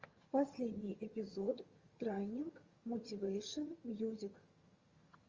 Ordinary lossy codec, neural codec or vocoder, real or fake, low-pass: Opus, 32 kbps; vocoder, 22.05 kHz, 80 mel bands, HiFi-GAN; fake; 7.2 kHz